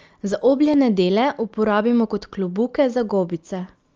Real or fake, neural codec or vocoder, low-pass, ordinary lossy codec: real; none; 7.2 kHz; Opus, 24 kbps